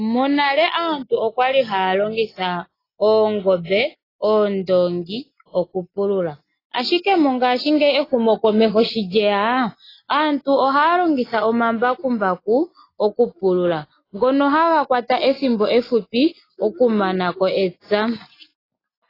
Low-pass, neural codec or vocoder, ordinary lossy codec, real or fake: 5.4 kHz; none; AAC, 24 kbps; real